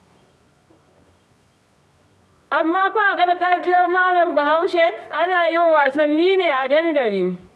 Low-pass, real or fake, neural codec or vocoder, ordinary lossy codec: none; fake; codec, 24 kHz, 0.9 kbps, WavTokenizer, medium music audio release; none